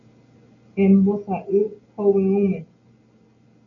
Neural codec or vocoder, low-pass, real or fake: none; 7.2 kHz; real